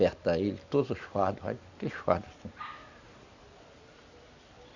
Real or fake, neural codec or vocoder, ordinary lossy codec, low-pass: real; none; none; 7.2 kHz